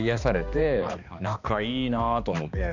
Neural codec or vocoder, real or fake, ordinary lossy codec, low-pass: codec, 16 kHz, 2 kbps, X-Codec, HuBERT features, trained on balanced general audio; fake; none; 7.2 kHz